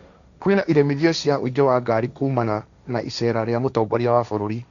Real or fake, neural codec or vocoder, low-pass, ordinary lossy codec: fake; codec, 16 kHz, 1.1 kbps, Voila-Tokenizer; 7.2 kHz; none